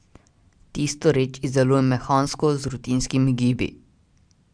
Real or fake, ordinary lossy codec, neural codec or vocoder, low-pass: real; Opus, 64 kbps; none; 9.9 kHz